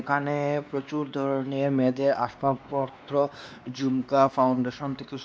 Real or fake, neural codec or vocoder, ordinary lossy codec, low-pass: fake; codec, 16 kHz, 2 kbps, X-Codec, WavLM features, trained on Multilingual LibriSpeech; none; none